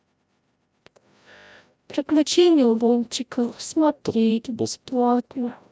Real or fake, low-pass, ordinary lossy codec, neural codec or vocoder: fake; none; none; codec, 16 kHz, 0.5 kbps, FreqCodec, larger model